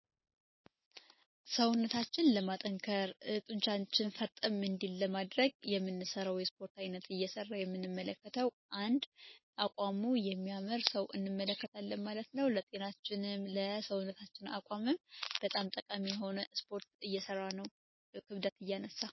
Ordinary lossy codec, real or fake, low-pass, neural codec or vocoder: MP3, 24 kbps; real; 7.2 kHz; none